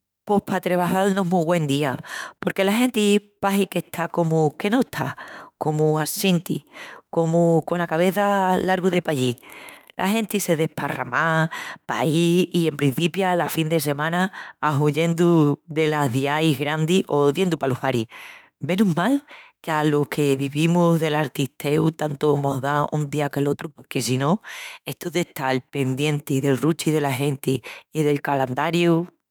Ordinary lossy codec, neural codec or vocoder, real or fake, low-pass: none; autoencoder, 48 kHz, 32 numbers a frame, DAC-VAE, trained on Japanese speech; fake; none